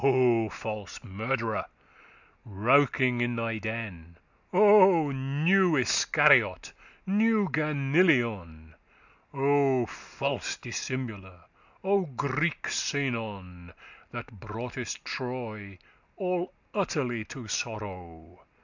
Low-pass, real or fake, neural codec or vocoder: 7.2 kHz; real; none